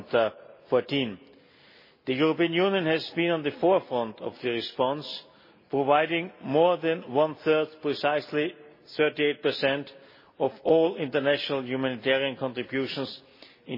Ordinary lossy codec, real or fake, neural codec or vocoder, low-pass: MP3, 24 kbps; real; none; 5.4 kHz